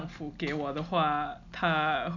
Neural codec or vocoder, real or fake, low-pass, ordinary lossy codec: none; real; 7.2 kHz; none